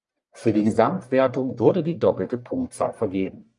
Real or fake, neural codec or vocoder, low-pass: fake; codec, 44.1 kHz, 1.7 kbps, Pupu-Codec; 10.8 kHz